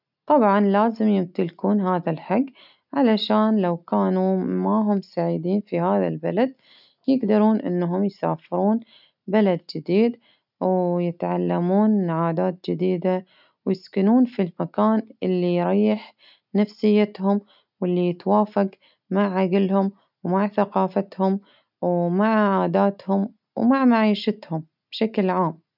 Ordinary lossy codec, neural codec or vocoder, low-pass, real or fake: none; none; 5.4 kHz; real